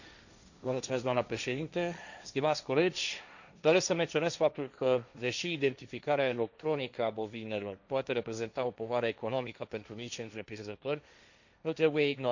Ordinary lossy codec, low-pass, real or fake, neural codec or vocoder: none; 7.2 kHz; fake; codec, 16 kHz, 1.1 kbps, Voila-Tokenizer